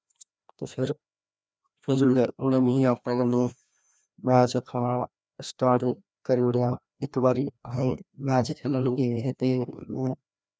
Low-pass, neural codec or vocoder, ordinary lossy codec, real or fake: none; codec, 16 kHz, 1 kbps, FreqCodec, larger model; none; fake